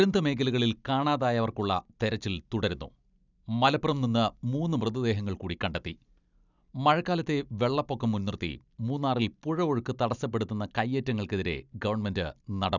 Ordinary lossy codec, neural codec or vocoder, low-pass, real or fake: none; none; 7.2 kHz; real